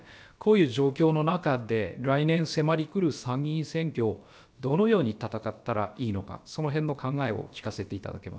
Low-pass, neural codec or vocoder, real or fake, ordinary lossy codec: none; codec, 16 kHz, about 1 kbps, DyCAST, with the encoder's durations; fake; none